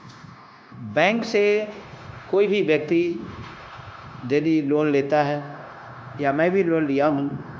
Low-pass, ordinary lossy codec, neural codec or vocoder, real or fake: none; none; codec, 16 kHz, 0.9 kbps, LongCat-Audio-Codec; fake